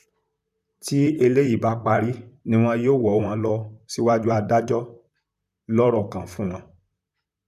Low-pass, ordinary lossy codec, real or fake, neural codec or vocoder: 14.4 kHz; none; fake; vocoder, 44.1 kHz, 128 mel bands, Pupu-Vocoder